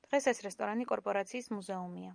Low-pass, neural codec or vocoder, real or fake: 9.9 kHz; none; real